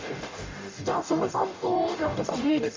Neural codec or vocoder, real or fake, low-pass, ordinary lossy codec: codec, 44.1 kHz, 0.9 kbps, DAC; fake; 7.2 kHz; MP3, 48 kbps